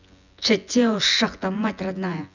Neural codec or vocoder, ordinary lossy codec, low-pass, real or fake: vocoder, 24 kHz, 100 mel bands, Vocos; none; 7.2 kHz; fake